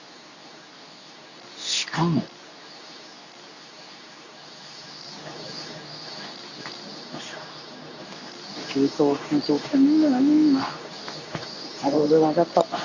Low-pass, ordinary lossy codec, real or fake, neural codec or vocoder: 7.2 kHz; none; fake; codec, 24 kHz, 0.9 kbps, WavTokenizer, medium speech release version 2